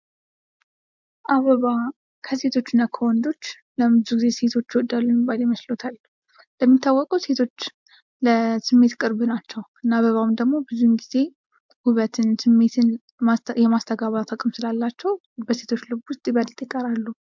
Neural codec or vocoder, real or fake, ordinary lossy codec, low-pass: none; real; MP3, 64 kbps; 7.2 kHz